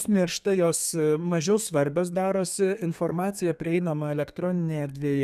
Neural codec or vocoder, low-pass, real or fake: codec, 32 kHz, 1.9 kbps, SNAC; 14.4 kHz; fake